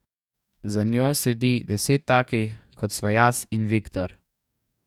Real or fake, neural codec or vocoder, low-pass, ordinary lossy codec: fake; codec, 44.1 kHz, 2.6 kbps, DAC; 19.8 kHz; none